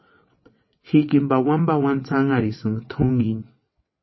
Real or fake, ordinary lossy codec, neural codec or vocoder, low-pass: fake; MP3, 24 kbps; vocoder, 22.05 kHz, 80 mel bands, WaveNeXt; 7.2 kHz